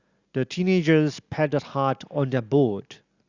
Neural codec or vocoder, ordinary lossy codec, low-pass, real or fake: none; Opus, 64 kbps; 7.2 kHz; real